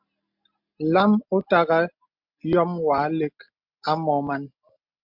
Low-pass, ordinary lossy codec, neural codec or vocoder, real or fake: 5.4 kHz; MP3, 48 kbps; none; real